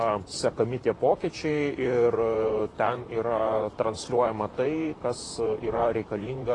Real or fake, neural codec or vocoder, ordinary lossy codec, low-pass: fake; vocoder, 44.1 kHz, 128 mel bands, Pupu-Vocoder; AAC, 32 kbps; 10.8 kHz